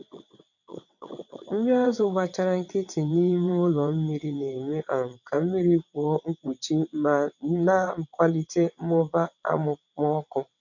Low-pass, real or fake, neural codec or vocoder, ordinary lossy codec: 7.2 kHz; fake; vocoder, 22.05 kHz, 80 mel bands, WaveNeXt; none